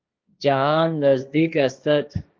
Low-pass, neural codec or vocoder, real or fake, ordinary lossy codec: 7.2 kHz; codec, 16 kHz, 1.1 kbps, Voila-Tokenizer; fake; Opus, 32 kbps